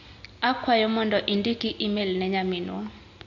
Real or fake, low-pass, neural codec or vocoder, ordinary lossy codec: real; 7.2 kHz; none; AAC, 48 kbps